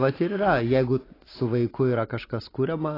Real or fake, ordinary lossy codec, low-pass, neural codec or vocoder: real; AAC, 24 kbps; 5.4 kHz; none